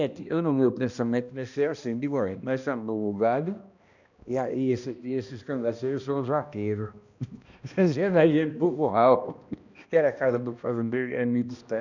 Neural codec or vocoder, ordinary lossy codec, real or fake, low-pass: codec, 16 kHz, 1 kbps, X-Codec, HuBERT features, trained on balanced general audio; none; fake; 7.2 kHz